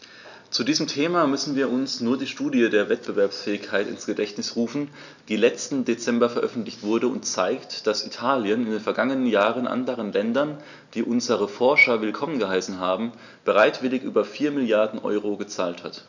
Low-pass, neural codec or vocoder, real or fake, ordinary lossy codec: 7.2 kHz; none; real; none